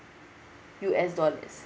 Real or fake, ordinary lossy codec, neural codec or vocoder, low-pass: real; none; none; none